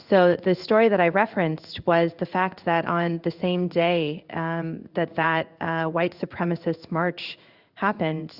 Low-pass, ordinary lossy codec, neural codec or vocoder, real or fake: 5.4 kHz; Opus, 64 kbps; codec, 16 kHz in and 24 kHz out, 1 kbps, XY-Tokenizer; fake